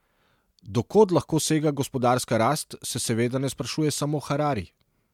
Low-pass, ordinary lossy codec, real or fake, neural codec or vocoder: 19.8 kHz; MP3, 96 kbps; real; none